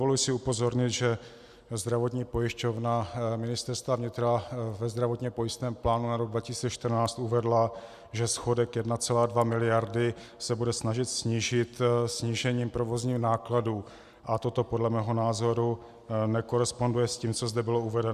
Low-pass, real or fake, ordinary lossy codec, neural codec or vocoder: 14.4 kHz; real; Opus, 64 kbps; none